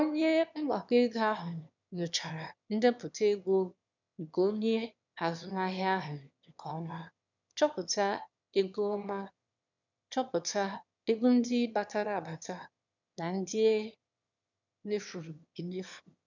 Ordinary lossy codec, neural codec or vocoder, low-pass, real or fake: none; autoencoder, 22.05 kHz, a latent of 192 numbers a frame, VITS, trained on one speaker; 7.2 kHz; fake